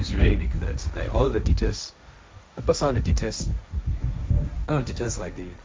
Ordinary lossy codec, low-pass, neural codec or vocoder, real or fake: none; none; codec, 16 kHz, 1.1 kbps, Voila-Tokenizer; fake